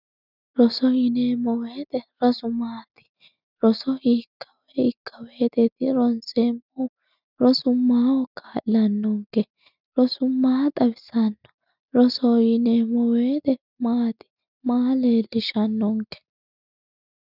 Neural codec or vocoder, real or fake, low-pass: none; real; 5.4 kHz